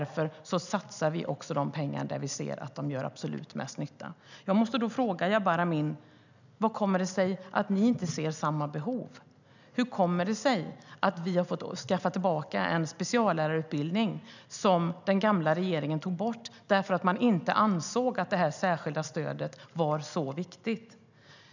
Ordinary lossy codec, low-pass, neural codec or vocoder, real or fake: none; 7.2 kHz; none; real